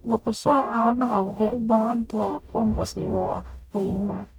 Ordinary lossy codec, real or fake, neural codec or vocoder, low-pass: none; fake; codec, 44.1 kHz, 0.9 kbps, DAC; 19.8 kHz